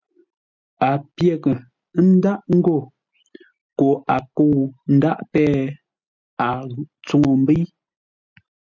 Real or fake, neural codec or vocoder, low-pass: real; none; 7.2 kHz